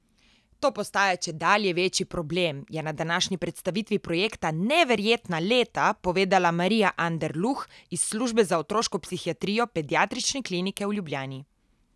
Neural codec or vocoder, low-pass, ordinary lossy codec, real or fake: none; none; none; real